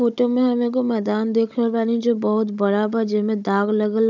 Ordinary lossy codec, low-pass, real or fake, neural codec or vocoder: none; 7.2 kHz; fake; codec, 16 kHz, 16 kbps, FunCodec, trained on Chinese and English, 50 frames a second